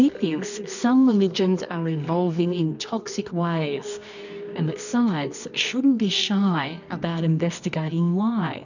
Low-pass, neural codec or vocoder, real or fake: 7.2 kHz; codec, 24 kHz, 0.9 kbps, WavTokenizer, medium music audio release; fake